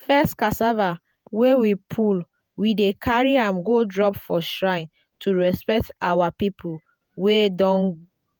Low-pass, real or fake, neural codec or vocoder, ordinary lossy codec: none; fake; vocoder, 48 kHz, 128 mel bands, Vocos; none